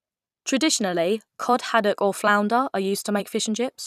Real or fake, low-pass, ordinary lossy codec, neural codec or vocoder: fake; 14.4 kHz; none; vocoder, 44.1 kHz, 128 mel bands every 256 samples, BigVGAN v2